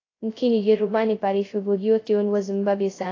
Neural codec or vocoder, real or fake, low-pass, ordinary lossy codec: codec, 16 kHz, 0.2 kbps, FocalCodec; fake; 7.2 kHz; AAC, 32 kbps